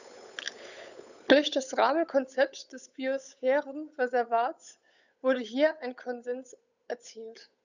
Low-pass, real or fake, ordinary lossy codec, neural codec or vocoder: 7.2 kHz; fake; none; codec, 16 kHz, 8 kbps, FunCodec, trained on Chinese and English, 25 frames a second